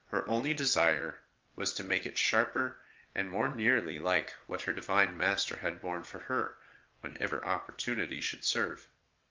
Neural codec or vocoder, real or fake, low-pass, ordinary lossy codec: vocoder, 22.05 kHz, 80 mel bands, WaveNeXt; fake; 7.2 kHz; Opus, 24 kbps